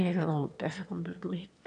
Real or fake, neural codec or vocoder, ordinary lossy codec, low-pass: fake; autoencoder, 22.05 kHz, a latent of 192 numbers a frame, VITS, trained on one speaker; none; 9.9 kHz